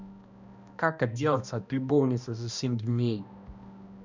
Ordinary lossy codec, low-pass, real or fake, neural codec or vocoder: none; 7.2 kHz; fake; codec, 16 kHz, 1 kbps, X-Codec, HuBERT features, trained on balanced general audio